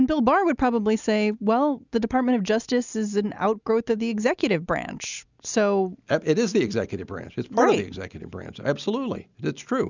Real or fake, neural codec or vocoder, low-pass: real; none; 7.2 kHz